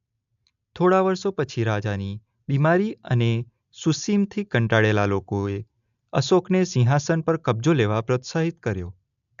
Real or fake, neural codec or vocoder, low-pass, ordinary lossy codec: real; none; 7.2 kHz; AAC, 96 kbps